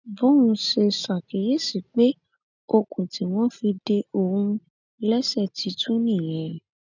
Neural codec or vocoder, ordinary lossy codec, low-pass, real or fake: none; none; 7.2 kHz; real